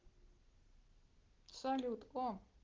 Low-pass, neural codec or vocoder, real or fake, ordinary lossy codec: 7.2 kHz; none; real; Opus, 16 kbps